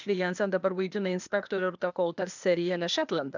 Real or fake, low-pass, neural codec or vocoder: fake; 7.2 kHz; codec, 16 kHz, 0.8 kbps, ZipCodec